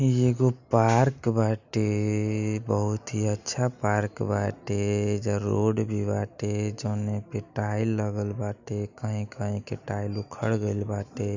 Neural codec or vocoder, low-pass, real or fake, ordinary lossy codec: none; 7.2 kHz; real; none